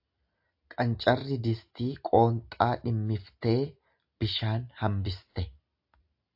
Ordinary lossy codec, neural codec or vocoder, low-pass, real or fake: AAC, 48 kbps; none; 5.4 kHz; real